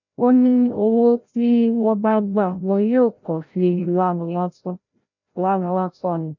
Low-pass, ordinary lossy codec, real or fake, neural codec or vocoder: 7.2 kHz; none; fake; codec, 16 kHz, 0.5 kbps, FreqCodec, larger model